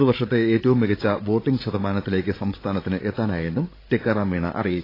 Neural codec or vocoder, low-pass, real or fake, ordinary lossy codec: codec, 16 kHz, 16 kbps, FreqCodec, larger model; 5.4 kHz; fake; AAC, 24 kbps